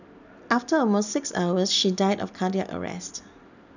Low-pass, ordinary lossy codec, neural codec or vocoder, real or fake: 7.2 kHz; none; none; real